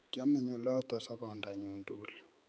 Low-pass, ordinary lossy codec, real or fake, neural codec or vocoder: none; none; fake; codec, 16 kHz, 4 kbps, X-Codec, HuBERT features, trained on balanced general audio